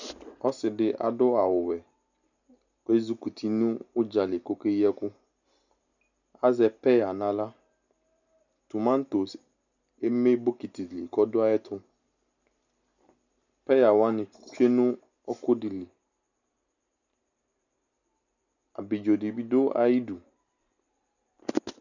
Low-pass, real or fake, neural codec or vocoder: 7.2 kHz; real; none